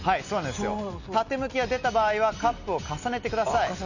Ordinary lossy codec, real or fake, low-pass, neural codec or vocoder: none; real; 7.2 kHz; none